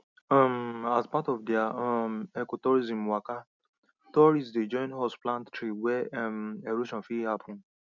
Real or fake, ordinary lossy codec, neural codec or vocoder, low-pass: real; none; none; 7.2 kHz